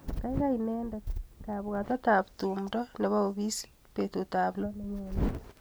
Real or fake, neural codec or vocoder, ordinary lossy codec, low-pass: real; none; none; none